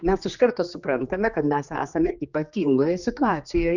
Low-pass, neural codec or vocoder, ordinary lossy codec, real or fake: 7.2 kHz; codec, 16 kHz, 2 kbps, X-Codec, HuBERT features, trained on general audio; Opus, 64 kbps; fake